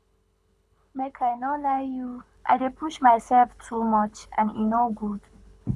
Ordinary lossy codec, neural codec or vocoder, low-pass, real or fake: none; codec, 24 kHz, 6 kbps, HILCodec; none; fake